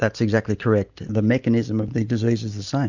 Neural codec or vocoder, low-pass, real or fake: none; 7.2 kHz; real